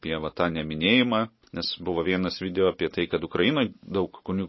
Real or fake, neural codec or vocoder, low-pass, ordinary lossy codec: real; none; 7.2 kHz; MP3, 24 kbps